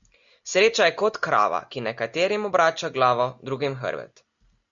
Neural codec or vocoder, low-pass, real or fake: none; 7.2 kHz; real